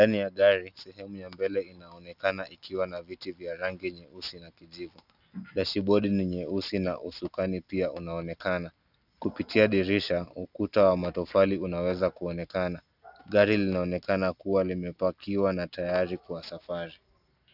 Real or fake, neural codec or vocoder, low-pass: real; none; 5.4 kHz